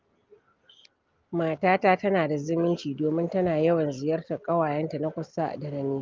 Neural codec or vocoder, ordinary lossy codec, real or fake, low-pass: none; Opus, 32 kbps; real; 7.2 kHz